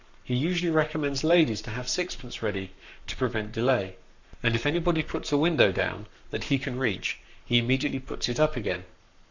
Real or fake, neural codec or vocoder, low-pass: fake; codec, 44.1 kHz, 7.8 kbps, Pupu-Codec; 7.2 kHz